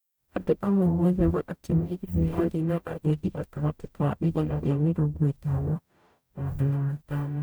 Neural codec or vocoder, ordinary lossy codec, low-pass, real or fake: codec, 44.1 kHz, 0.9 kbps, DAC; none; none; fake